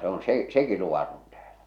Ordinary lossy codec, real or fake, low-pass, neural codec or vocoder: none; real; 19.8 kHz; none